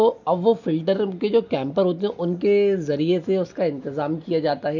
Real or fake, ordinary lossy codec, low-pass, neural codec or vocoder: real; none; 7.2 kHz; none